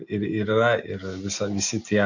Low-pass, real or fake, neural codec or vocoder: 7.2 kHz; real; none